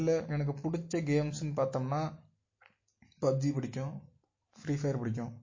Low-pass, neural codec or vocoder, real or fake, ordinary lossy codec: 7.2 kHz; none; real; MP3, 32 kbps